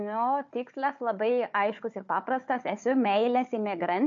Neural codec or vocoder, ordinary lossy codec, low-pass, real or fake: codec, 16 kHz, 4 kbps, FunCodec, trained on Chinese and English, 50 frames a second; MP3, 48 kbps; 7.2 kHz; fake